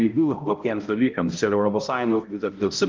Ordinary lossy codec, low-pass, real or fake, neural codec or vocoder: Opus, 24 kbps; 7.2 kHz; fake; codec, 16 kHz, 0.5 kbps, X-Codec, HuBERT features, trained on balanced general audio